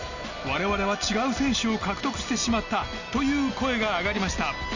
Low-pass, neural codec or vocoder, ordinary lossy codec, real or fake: 7.2 kHz; none; none; real